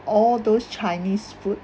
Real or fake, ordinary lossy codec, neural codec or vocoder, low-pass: real; none; none; none